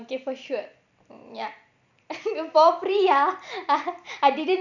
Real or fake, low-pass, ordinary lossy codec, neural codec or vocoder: fake; 7.2 kHz; none; vocoder, 44.1 kHz, 128 mel bands every 256 samples, BigVGAN v2